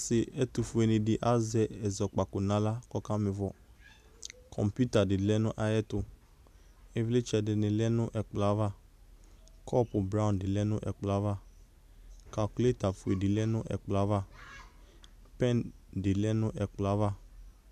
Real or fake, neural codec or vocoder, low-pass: real; none; 14.4 kHz